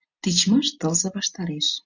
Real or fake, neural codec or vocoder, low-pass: real; none; 7.2 kHz